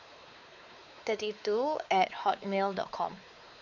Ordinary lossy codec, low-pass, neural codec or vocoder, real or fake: none; 7.2 kHz; codec, 16 kHz, 4 kbps, X-Codec, WavLM features, trained on Multilingual LibriSpeech; fake